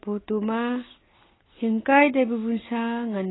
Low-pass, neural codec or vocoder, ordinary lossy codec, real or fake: 7.2 kHz; none; AAC, 16 kbps; real